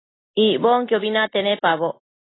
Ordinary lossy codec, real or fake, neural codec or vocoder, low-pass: AAC, 16 kbps; real; none; 7.2 kHz